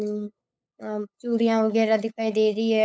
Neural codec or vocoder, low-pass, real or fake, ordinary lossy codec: codec, 16 kHz, 8 kbps, FunCodec, trained on LibriTTS, 25 frames a second; none; fake; none